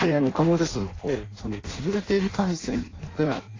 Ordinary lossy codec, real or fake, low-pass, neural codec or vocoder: AAC, 32 kbps; fake; 7.2 kHz; codec, 16 kHz in and 24 kHz out, 0.6 kbps, FireRedTTS-2 codec